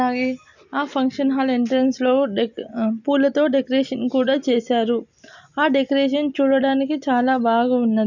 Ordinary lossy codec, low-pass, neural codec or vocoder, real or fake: none; 7.2 kHz; none; real